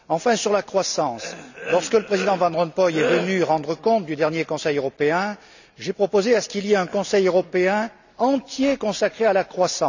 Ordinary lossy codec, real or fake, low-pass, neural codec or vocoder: none; real; 7.2 kHz; none